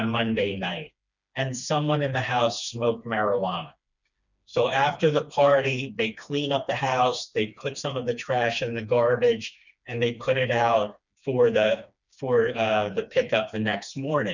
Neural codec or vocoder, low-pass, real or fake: codec, 16 kHz, 2 kbps, FreqCodec, smaller model; 7.2 kHz; fake